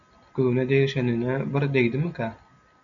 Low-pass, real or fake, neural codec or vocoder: 7.2 kHz; real; none